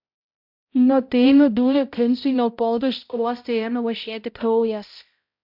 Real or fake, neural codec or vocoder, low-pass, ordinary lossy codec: fake; codec, 16 kHz, 0.5 kbps, X-Codec, HuBERT features, trained on balanced general audio; 5.4 kHz; MP3, 48 kbps